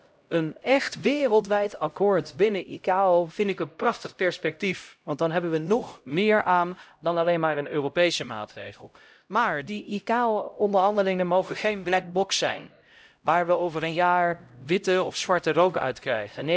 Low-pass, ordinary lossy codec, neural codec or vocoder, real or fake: none; none; codec, 16 kHz, 0.5 kbps, X-Codec, HuBERT features, trained on LibriSpeech; fake